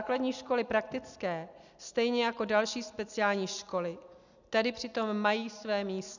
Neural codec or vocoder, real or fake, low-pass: none; real; 7.2 kHz